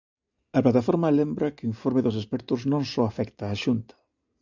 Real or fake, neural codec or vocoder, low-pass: real; none; 7.2 kHz